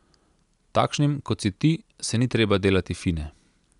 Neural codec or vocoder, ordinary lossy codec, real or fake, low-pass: none; none; real; 10.8 kHz